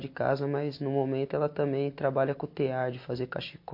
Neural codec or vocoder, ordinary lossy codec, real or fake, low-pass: none; MP3, 32 kbps; real; 5.4 kHz